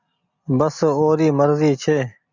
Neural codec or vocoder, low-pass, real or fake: none; 7.2 kHz; real